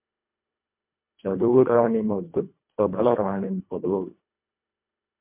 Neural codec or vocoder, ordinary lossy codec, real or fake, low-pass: codec, 24 kHz, 1.5 kbps, HILCodec; MP3, 32 kbps; fake; 3.6 kHz